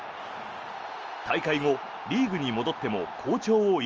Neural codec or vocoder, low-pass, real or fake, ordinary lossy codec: none; 7.2 kHz; real; Opus, 24 kbps